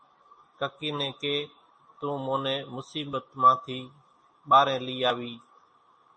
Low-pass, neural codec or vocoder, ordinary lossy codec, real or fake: 9.9 kHz; none; MP3, 32 kbps; real